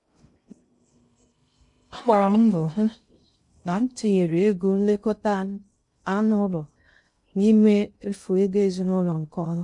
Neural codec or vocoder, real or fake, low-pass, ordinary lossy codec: codec, 16 kHz in and 24 kHz out, 0.6 kbps, FocalCodec, streaming, 2048 codes; fake; 10.8 kHz; MP3, 64 kbps